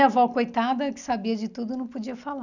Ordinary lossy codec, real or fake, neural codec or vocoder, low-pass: none; real; none; 7.2 kHz